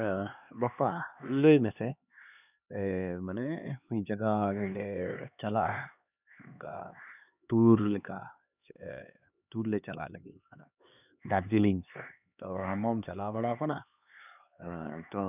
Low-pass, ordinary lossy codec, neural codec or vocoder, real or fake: 3.6 kHz; none; codec, 16 kHz, 2 kbps, X-Codec, HuBERT features, trained on LibriSpeech; fake